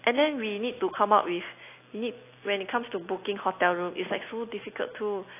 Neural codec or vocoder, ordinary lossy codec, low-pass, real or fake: none; AAC, 24 kbps; 3.6 kHz; real